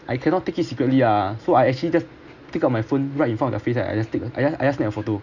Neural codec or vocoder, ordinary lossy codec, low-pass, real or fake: none; none; 7.2 kHz; real